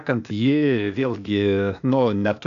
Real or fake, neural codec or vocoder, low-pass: fake; codec, 16 kHz, 0.8 kbps, ZipCodec; 7.2 kHz